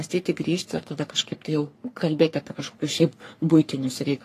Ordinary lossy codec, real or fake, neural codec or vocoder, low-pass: AAC, 48 kbps; fake; codec, 44.1 kHz, 3.4 kbps, Pupu-Codec; 14.4 kHz